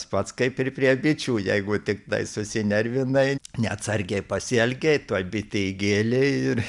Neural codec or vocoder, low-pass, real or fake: none; 10.8 kHz; real